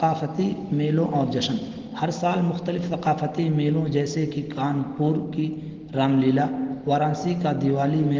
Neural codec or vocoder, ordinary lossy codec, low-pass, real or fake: none; Opus, 16 kbps; 7.2 kHz; real